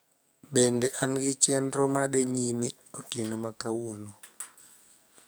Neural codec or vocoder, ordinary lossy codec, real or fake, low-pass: codec, 44.1 kHz, 2.6 kbps, SNAC; none; fake; none